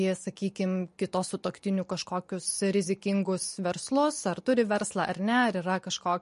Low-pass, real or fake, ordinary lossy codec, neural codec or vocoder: 10.8 kHz; real; MP3, 48 kbps; none